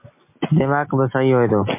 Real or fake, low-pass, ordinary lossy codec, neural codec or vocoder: real; 3.6 kHz; MP3, 24 kbps; none